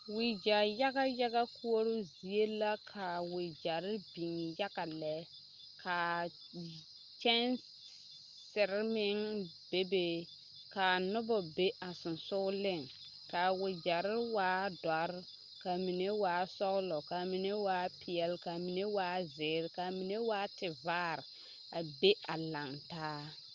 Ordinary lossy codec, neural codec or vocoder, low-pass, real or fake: Opus, 32 kbps; none; 7.2 kHz; real